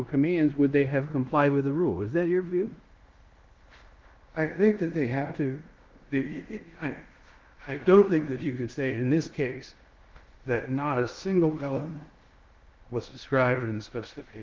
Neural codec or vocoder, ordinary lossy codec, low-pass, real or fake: codec, 16 kHz in and 24 kHz out, 0.9 kbps, LongCat-Audio-Codec, fine tuned four codebook decoder; Opus, 32 kbps; 7.2 kHz; fake